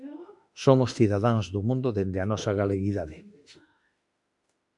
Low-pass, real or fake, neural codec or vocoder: 10.8 kHz; fake; autoencoder, 48 kHz, 32 numbers a frame, DAC-VAE, trained on Japanese speech